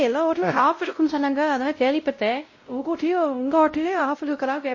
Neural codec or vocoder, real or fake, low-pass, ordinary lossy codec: codec, 16 kHz, 0.5 kbps, X-Codec, WavLM features, trained on Multilingual LibriSpeech; fake; 7.2 kHz; MP3, 32 kbps